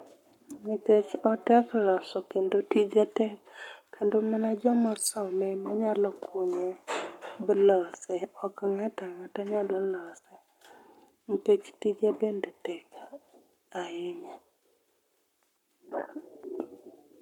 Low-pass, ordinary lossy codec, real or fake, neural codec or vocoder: 19.8 kHz; MP3, 96 kbps; fake; codec, 44.1 kHz, 7.8 kbps, Pupu-Codec